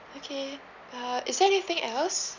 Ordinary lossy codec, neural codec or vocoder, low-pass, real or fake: none; none; 7.2 kHz; real